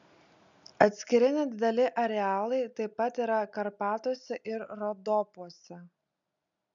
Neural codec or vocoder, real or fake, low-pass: none; real; 7.2 kHz